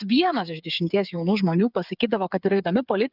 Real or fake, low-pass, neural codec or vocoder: fake; 5.4 kHz; codec, 16 kHz, 8 kbps, FreqCodec, smaller model